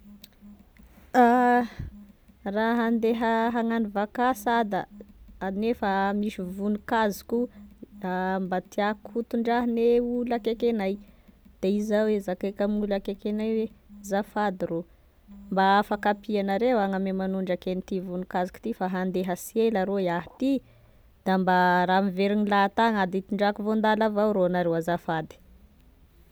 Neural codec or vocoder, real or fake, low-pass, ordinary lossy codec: none; real; none; none